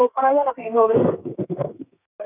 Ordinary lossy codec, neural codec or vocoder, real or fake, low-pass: none; codec, 32 kHz, 1.9 kbps, SNAC; fake; 3.6 kHz